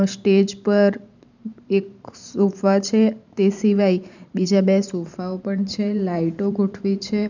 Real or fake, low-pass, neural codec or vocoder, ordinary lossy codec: fake; 7.2 kHz; vocoder, 44.1 kHz, 128 mel bands every 512 samples, BigVGAN v2; none